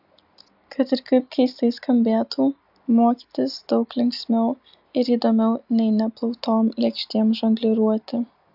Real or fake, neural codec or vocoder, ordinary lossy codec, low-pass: real; none; AAC, 48 kbps; 5.4 kHz